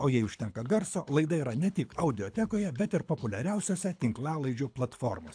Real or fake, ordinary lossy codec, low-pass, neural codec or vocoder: fake; AAC, 64 kbps; 9.9 kHz; codec, 24 kHz, 6 kbps, HILCodec